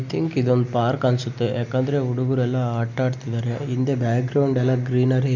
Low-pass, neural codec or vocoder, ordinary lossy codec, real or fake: 7.2 kHz; none; none; real